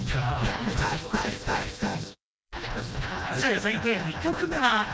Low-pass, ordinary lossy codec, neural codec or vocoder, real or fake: none; none; codec, 16 kHz, 1 kbps, FreqCodec, smaller model; fake